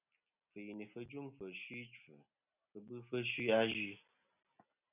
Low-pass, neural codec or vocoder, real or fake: 3.6 kHz; none; real